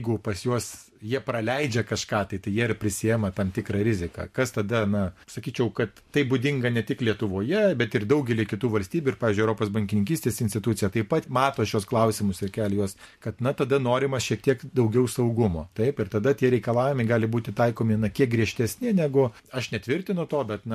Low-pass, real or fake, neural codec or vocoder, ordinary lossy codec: 14.4 kHz; fake; vocoder, 44.1 kHz, 128 mel bands every 512 samples, BigVGAN v2; MP3, 64 kbps